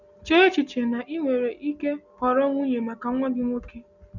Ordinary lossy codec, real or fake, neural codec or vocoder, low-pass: none; real; none; 7.2 kHz